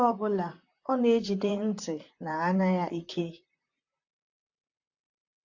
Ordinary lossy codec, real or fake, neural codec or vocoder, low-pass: AAC, 48 kbps; fake; vocoder, 44.1 kHz, 128 mel bands, Pupu-Vocoder; 7.2 kHz